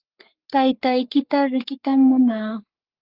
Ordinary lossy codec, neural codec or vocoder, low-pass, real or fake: Opus, 24 kbps; codec, 44.1 kHz, 3.4 kbps, Pupu-Codec; 5.4 kHz; fake